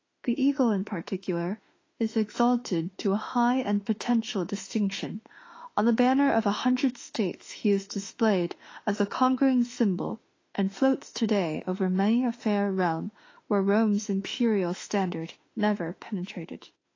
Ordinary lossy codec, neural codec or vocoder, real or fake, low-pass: AAC, 32 kbps; autoencoder, 48 kHz, 32 numbers a frame, DAC-VAE, trained on Japanese speech; fake; 7.2 kHz